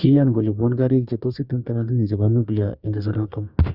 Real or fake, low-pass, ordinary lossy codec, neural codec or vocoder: fake; 5.4 kHz; Opus, 64 kbps; codec, 44.1 kHz, 2.6 kbps, DAC